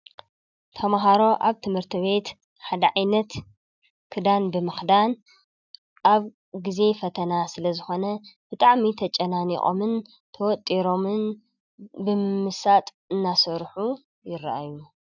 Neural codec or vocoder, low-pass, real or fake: none; 7.2 kHz; real